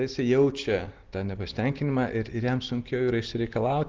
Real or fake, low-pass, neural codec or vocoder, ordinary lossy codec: real; 7.2 kHz; none; Opus, 16 kbps